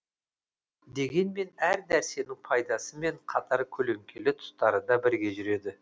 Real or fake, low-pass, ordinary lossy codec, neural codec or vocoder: real; none; none; none